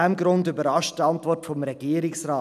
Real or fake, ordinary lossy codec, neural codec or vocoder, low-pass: real; none; none; 14.4 kHz